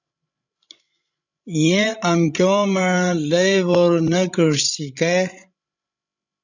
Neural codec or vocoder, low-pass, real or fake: codec, 16 kHz, 16 kbps, FreqCodec, larger model; 7.2 kHz; fake